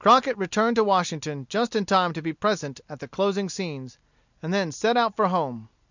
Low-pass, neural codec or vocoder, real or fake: 7.2 kHz; none; real